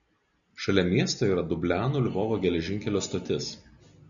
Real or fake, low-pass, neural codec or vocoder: real; 7.2 kHz; none